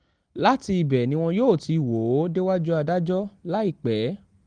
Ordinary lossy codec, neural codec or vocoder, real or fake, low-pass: Opus, 24 kbps; none; real; 9.9 kHz